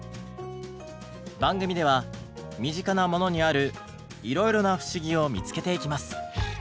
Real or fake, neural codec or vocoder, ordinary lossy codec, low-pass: real; none; none; none